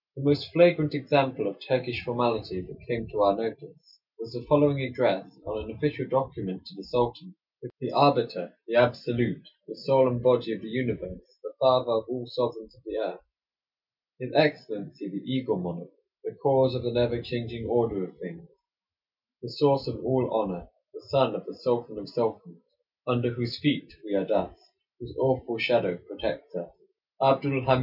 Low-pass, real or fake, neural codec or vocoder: 5.4 kHz; real; none